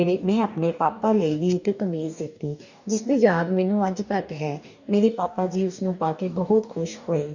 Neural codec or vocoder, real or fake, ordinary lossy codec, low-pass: codec, 44.1 kHz, 2.6 kbps, DAC; fake; none; 7.2 kHz